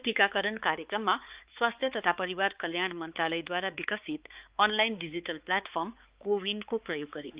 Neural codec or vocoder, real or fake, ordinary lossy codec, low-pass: codec, 16 kHz, 4 kbps, X-Codec, HuBERT features, trained on balanced general audio; fake; Opus, 32 kbps; 3.6 kHz